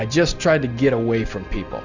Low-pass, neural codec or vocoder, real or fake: 7.2 kHz; none; real